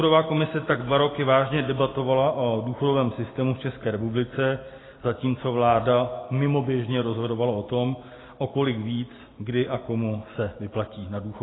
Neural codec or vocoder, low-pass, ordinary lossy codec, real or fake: none; 7.2 kHz; AAC, 16 kbps; real